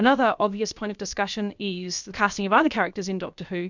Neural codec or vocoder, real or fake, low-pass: codec, 16 kHz, about 1 kbps, DyCAST, with the encoder's durations; fake; 7.2 kHz